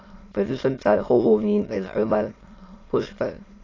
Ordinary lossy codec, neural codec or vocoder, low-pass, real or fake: AAC, 32 kbps; autoencoder, 22.05 kHz, a latent of 192 numbers a frame, VITS, trained on many speakers; 7.2 kHz; fake